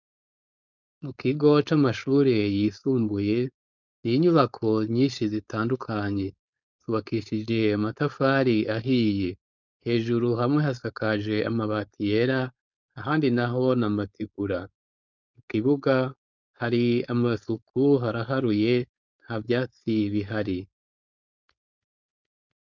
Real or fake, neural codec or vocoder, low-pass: fake; codec, 16 kHz, 4.8 kbps, FACodec; 7.2 kHz